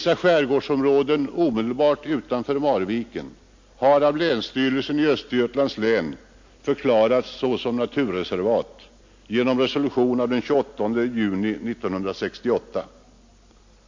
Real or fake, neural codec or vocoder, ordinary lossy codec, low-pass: real; none; MP3, 48 kbps; 7.2 kHz